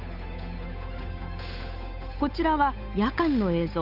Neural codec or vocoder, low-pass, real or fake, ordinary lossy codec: codec, 16 kHz, 8 kbps, FunCodec, trained on Chinese and English, 25 frames a second; 5.4 kHz; fake; none